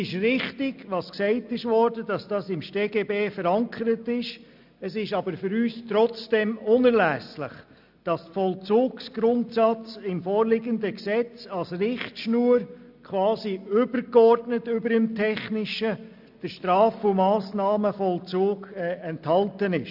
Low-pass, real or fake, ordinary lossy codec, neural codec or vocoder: 5.4 kHz; real; none; none